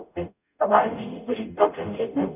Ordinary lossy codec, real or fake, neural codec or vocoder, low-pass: none; fake; codec, 44.1 kHz, 0.9 kbps, DAC; 3.6 kHz